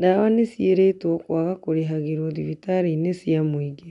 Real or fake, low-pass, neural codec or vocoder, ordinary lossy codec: real; 10.8 kHz; none; none